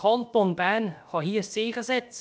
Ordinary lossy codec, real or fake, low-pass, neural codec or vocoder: none; fake; none; codec, 16 kHz, about 1 kbps, DyCAST, with the encoder's durations